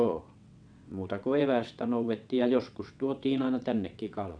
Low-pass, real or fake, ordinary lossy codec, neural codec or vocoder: 9.9 kHz; fake; none; vocoder, 22.05 kHz, 80 mel bands, WaveNeXt